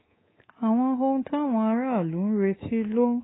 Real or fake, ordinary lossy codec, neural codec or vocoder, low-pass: fake; AAC, 16 kbps; codec, 24 kHz, 3.1 kbps, DualCodec; 7.2 kHz